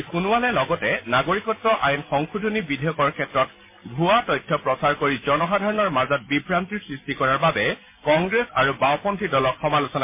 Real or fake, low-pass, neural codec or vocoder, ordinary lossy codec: real; 3.6 kHz; none; MP3, 24 kbps